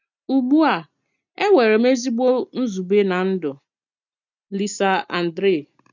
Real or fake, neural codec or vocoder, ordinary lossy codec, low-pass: real; none; none; 7.2 kHz